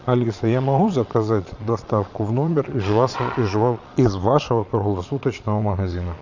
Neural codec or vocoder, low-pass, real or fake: vocoder, 22.05 kHz, 80 mel bands, Vocos; 7.2 kHz; fake